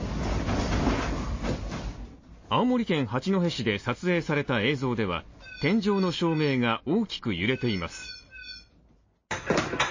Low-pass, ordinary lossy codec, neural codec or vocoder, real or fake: 7.2 kHz; MP3, 32 kbps; none; real